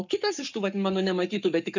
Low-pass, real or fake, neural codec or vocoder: 7.2 kHz; fake; codec, 44.1 kHz, 7.8 kbps, Pupu-Codec